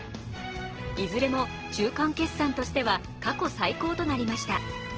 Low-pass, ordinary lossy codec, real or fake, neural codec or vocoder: 7.2 kHz; Opus, 16 kbps; real; none